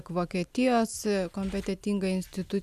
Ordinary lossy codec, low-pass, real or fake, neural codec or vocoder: AAC, 96 kbps; 14.4 kHz; real; none